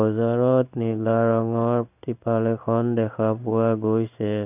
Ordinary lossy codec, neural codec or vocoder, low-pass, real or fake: none; codec, 16 kHz in and 24 kHz out, 1 kbps, XY-Tokenizer; 3.6 kHz; fake